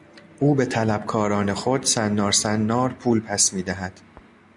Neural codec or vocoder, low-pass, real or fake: none; 10.8 kHz; real